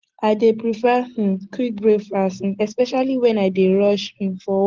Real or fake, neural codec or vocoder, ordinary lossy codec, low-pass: real; none; Opus, 16 kbps; 7.2 kHz